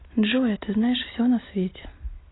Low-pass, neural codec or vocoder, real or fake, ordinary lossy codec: 7.2 kHz; none; real; AAC, 16 kbps